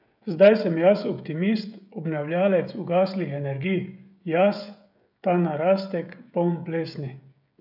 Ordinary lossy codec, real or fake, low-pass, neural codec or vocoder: none; fake; 5.4 kHz; codec, 16 kHz, 16 kbps, FreqCodec, smaller model